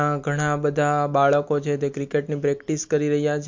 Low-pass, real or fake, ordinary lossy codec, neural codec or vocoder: 7.2 kHz; real; MP3, 48 kbps; none